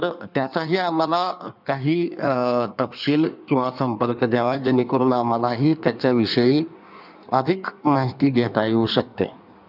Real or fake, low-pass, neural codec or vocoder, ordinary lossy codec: fake; 5.4 kHz; codec, 16 kHz in and 24 kHz out, 1.1 kbps, FireRedTTS-2 codec; none